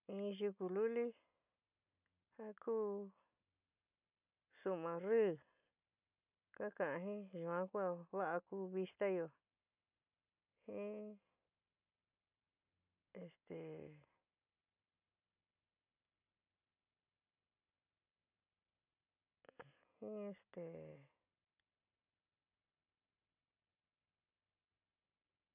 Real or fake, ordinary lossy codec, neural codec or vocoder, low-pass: real; none; none; 3.6 kHz